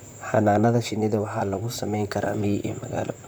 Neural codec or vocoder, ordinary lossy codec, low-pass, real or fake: vocoder, 44.1 kHz, 128 mel bands, Pupu-Vocoder; none; none; fake